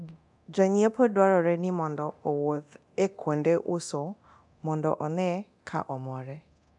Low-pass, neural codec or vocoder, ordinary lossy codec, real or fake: 10.8 kHz; codec, 24 kHz, 0.9 kbps, DualCodec; none; fake